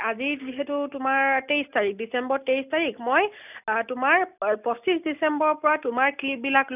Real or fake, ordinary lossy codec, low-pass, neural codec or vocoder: real; none; 3.6 kHz; none